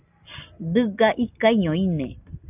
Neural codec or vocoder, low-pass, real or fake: none; 3.6 kHz; real